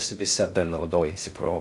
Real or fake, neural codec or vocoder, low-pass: fake; codec, 16 kHz in and 24 kHz out, 0.6 kbps, FocalCodec, streaming, 2048 codes; 10.8 kHz